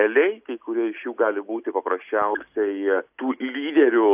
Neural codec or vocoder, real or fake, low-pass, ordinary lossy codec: none; real; 3.6 kHz; AAC, 32 kbps